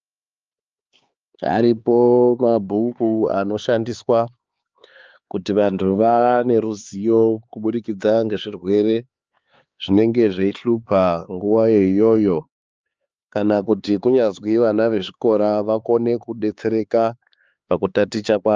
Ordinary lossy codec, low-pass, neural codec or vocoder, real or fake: Opus, 24 kbps; 7.2 kHz; codec, 16 kHz, 4 kbps, X-Codec, HuBERT features, trained on LibriSpeech; fake